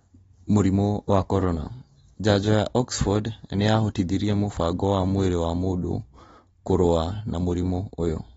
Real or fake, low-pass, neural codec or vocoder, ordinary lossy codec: real; 19.8 kHz; none; AAC, 24 kbps